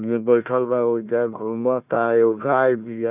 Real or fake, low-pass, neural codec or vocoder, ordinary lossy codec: fake; 3.6 kHz; codec, 16 kHz, 1 kbps, FunCodec, trained on Chinese and English, 50 frames a second; none